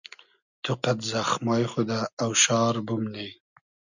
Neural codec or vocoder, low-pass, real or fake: none; 7.2 kHz; real